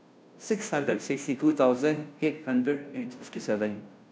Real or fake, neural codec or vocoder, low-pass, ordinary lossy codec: fake; codec, 16 kHz, 0.5 kbps, FunCodec, trained on Chinese and English, 25 frames a second; none; none